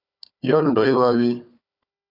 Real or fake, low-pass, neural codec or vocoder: fake; 5.4 kHz; codec, 16 kHz, 4 kbps, FunCodec, trained on Chinese and English, 50 frames a second